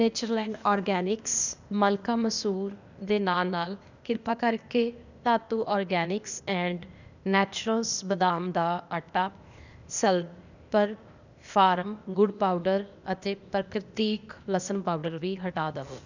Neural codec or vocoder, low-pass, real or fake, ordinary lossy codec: codec, 16 kHz, 0.8 kbps, ZipCodec; 7.2 kHz; fake; none